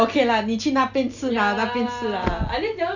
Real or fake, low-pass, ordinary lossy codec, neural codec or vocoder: real; 7.2 kHz; none; none